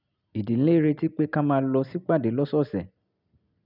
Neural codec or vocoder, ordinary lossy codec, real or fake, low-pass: none; none; real; 5.4 kHz